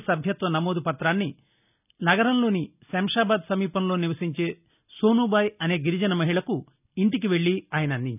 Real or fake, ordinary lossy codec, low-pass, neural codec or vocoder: real; none; 3.6 kHz; none